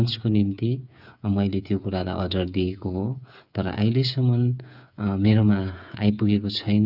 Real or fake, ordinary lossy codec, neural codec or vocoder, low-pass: fake; none; codec, 16 kHz, 8 kbps, FreqCodec, smaller model; 5.4 kHz